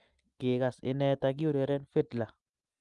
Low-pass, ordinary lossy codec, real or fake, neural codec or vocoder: 10.8 kHz; Opus, 32 kbps; real; none